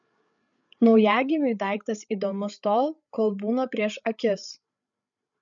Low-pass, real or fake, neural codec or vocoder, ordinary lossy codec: 7.2 kHz; fake; codec, 16 kHz, 8 kbps, FreqCodec, larger model; MP3, 96 kbps